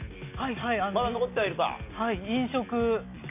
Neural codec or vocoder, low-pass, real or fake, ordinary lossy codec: none; 3.6 kHz; real; none